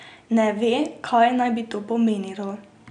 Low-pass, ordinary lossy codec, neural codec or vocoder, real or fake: 9.9 kHz; none; none; real